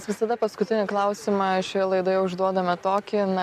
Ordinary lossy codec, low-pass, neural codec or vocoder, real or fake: MP3, 96 kbps; 14.4 kHz; none; real